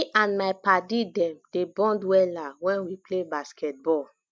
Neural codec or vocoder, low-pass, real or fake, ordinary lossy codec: none; none; real; none